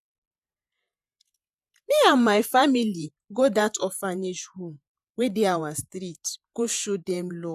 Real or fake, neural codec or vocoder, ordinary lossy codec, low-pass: fake; vocoder, 48 kHz, 128 mel bands, Vocos; none; 14.4 kHz